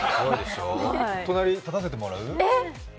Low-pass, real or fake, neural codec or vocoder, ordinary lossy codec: none; real; none; none